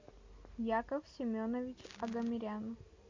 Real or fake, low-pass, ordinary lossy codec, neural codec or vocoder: real; 7.2 kHz; MP3, 48 kbps; none